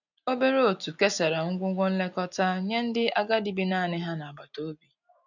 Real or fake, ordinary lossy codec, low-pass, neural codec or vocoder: real; none; 7.2 kHz; none